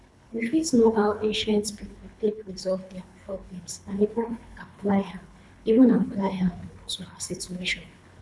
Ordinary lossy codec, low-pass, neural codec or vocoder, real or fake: none; none; codec, 24 kHz, 3 kbps, HILCodec; fake